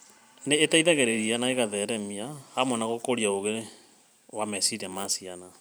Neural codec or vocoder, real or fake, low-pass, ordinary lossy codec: vocoder, 44.1 kHz, 128 mel bands every 512 samples, BigVGAN v2; fake; none; none